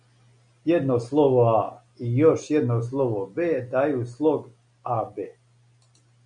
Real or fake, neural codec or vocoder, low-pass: real; none; 9.9 kHz